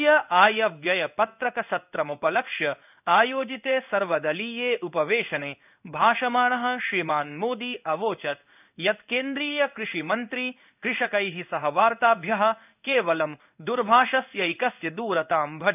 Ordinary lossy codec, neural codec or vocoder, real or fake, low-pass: none; codec, 16 kHz in and 24 kHz out, 1 kbps, XY-Tokenizer; fake; 3.6 kHz